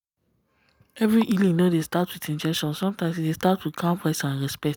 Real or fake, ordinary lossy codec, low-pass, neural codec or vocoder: real; none; none; none